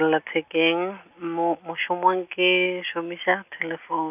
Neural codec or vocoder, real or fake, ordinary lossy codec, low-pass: none; real; none; 3.6 kHz